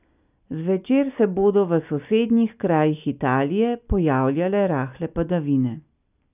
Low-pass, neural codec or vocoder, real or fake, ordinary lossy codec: 3.6 kHz; none; real; none